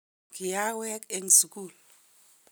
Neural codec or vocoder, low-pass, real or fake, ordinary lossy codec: none; none; real; none